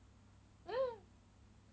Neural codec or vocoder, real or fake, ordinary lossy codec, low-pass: none; real; none; none